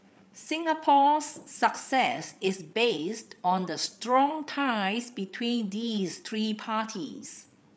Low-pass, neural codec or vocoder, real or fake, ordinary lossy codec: none; codec, 16 kHz, 8 kbps, FreqCodec, larger model; fake; none